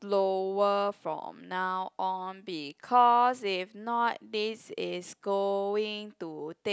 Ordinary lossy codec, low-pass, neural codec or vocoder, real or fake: none; none; none; real